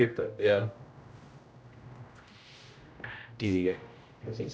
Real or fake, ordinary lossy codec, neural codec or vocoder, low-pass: fake; none; codec, 16 kHz, 0.5 kbps, X-Codec, HuBERT features, trained on general audio; none